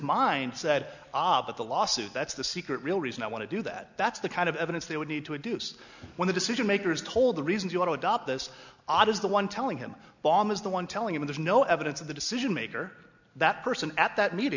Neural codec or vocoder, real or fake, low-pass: none; real; 7.2 kHz